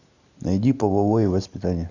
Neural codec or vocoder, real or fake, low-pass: none; real; 7.2 kHz